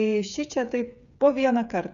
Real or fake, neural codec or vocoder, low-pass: fake; codec, 16 kHz, 8 kbps, FreqCodec, smaller model; 7.2 kHz